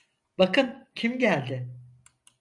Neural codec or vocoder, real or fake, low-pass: none; real; 10.8 kHz